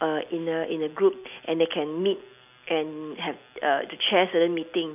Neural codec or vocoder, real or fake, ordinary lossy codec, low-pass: none; real; none; 3.6 kHz